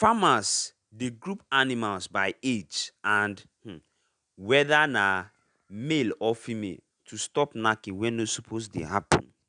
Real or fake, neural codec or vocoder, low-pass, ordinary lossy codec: real; none; 9.9 kHz; none